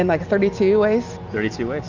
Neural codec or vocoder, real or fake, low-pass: none; real; 7.2 kHz